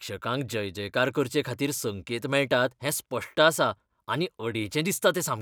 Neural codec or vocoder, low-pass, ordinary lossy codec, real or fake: none; none; none; real